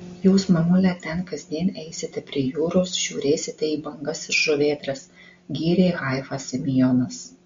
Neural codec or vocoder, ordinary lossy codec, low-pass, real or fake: none; MP3, 48 kbps; 7.2 kHz; real